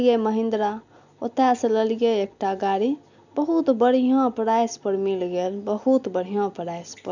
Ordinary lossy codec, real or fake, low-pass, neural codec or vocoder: none; real; 7.2 kHz; none